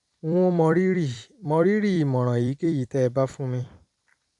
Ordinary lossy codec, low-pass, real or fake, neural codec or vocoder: none; 10.8 kHz; fake; vocoder, 44.1 kHz, 128 mel bands every 256 samples, BigVGAN v2